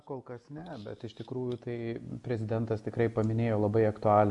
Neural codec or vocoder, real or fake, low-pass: none; real; 10.8 kHz